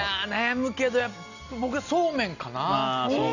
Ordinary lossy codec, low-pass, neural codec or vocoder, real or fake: none; 7.2 kHz; none; real